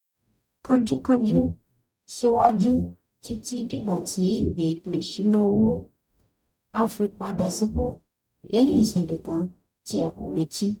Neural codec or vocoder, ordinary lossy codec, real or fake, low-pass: codec, 44.1 kHz, 0.9 kbps, DAC; none; fake; 19.8 kHz